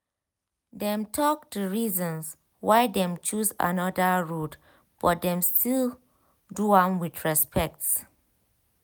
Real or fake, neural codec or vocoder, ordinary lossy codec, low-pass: real; none; none; none